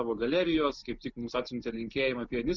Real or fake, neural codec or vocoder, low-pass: real; none; 7.2 kHz